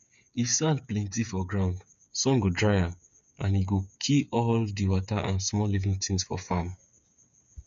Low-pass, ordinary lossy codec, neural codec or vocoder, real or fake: 7.2 kHz; none; codec, 16 kHz, 8 kbps, FreqCodec, smaller model; fake